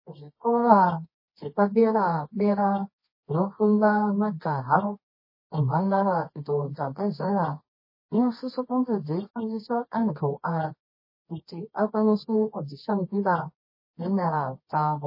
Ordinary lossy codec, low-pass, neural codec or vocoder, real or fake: MP3, 24 kbps; 5.4 kHz; codec, 24 kHz, 0.9 kbps, WavTokenizer, medium music audio release; fake